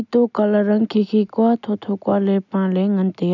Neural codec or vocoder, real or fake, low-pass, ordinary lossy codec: none; real; 7.2 kHz; none